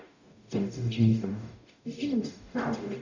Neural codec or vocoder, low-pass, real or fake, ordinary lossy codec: codec, 44.1 kHz, 0.9 kbps, DAC; 7.2 kHz; fake; none